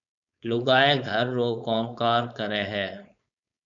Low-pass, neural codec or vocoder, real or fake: 7.2 kHz; codec, 16 kHz, 4.8 kbps, FACodec; fake